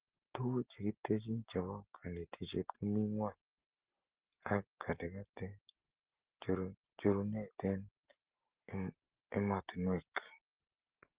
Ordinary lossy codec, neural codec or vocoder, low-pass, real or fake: Opus, 16 kbps; none; 3.6 kHz; real